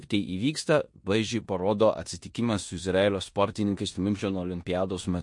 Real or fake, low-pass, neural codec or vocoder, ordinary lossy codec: fake; 10.8 kHz; codec, 16 kHz in and 24 kHz out, 0.9 kbps, LongCat-Audio-Codec, four codebook decoder; MP3, 48 kbps